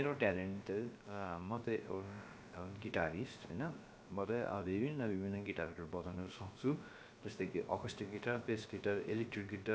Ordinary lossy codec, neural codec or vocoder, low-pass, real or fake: none; codec, 16 kHz, about 1 kbps, DyCAST, with the encoder's durations; none; fake